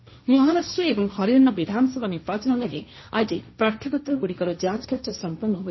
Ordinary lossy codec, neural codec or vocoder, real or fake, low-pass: MP3, 24 kbps; codec, 16 kHz, 1.1 kbps, Voila-Tokenizer; fake; 7.2 kHz